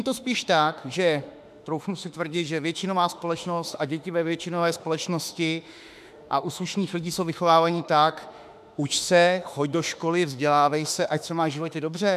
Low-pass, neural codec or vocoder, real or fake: 14.4 kHz; autoencoder, 48 kHz, 32 numbers a frame, DAC-VAE, trained on Japanese speech; fake